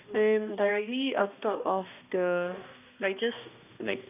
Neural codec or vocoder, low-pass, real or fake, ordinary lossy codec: codec, 16 kHz, 1 kbps, X-Codec, HuBERT features, trained on balanced general audio; 3.6 kHz; fake; none